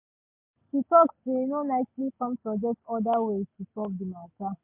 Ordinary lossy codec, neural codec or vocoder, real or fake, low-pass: MP3, 32 kbps; none; real; 3.6 kHz